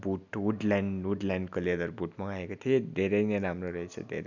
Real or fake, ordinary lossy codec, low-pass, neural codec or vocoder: real; none; 7.2 kHz; none